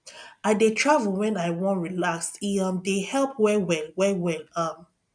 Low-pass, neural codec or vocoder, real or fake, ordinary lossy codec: 9.9 kHz; none; real; none